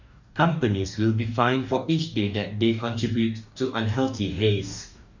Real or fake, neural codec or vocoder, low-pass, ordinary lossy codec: fake; codec, 44.1 kHz, 2.6 kbps, DAC; 7.2 kHz; none